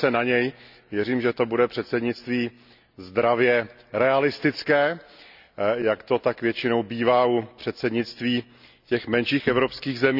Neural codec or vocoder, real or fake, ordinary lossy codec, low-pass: none; real; none; 5.4 kHz